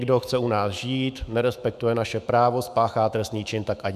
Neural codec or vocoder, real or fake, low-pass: autoencoder, 48 kHz, 128 numbers a frame, DAC-VAE, trained on Japanese speech; fake; 14.4 kHz